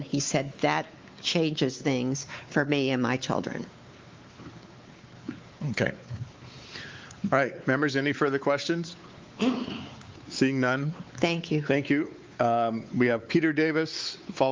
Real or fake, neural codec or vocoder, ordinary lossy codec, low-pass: fake; codec, 16 kHz, 4 kbps, X-Codec, WavLM features, trained on Multilingual LibriSpeech; Opus, 32 kbps; 7.2 kHz